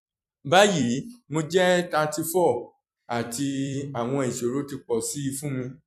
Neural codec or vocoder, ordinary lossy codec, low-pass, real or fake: vocoder, 44.1 kHz, 128 mel bands every 256 samples, BigVGAN v2; none; 14.4 kHz; fake